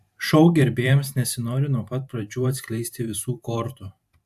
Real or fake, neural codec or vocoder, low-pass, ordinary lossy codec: fake; vocoder, 44.1 kHz, 128 mel bands every 512 samples, BigVGAN v2; 14.4 kHz; AAC, 96 kbps